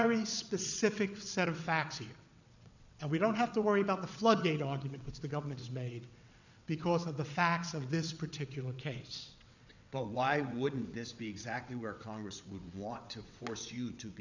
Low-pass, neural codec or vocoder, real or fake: 7.2 kHz; vocoder, 22.05 kHz, 80 mel bands, WaveNeXt; fake